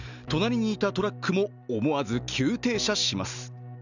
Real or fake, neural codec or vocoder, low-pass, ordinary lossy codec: real; none; 7.2 kHz; none